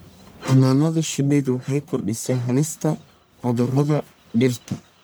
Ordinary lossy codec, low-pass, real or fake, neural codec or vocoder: none; none; fake; codec, 44.1 kHz, 1.7 kbps, Pupu-Codec